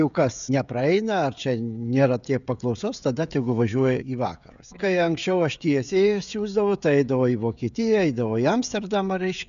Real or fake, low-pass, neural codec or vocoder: fake; 7.2 kHz; codec, 16 kHz, 16 kbps, FreqCodec, smaller model